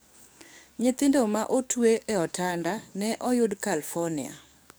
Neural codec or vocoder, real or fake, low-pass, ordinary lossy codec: codec, 44.1 kHz, 7.8 kbps, DAC; fake; none; none